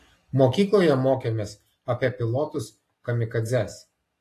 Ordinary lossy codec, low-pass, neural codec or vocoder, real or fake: AAC, 48 kbps; 14.4 kHz; none; real